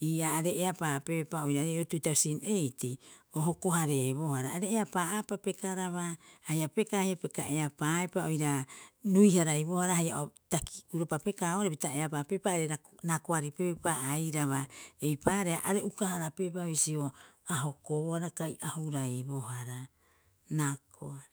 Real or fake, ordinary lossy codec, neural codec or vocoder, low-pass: fake; none; autoencoder, 48 kHz, 128 numbers a frame, DAC-VAE, trained on Japanese speech; none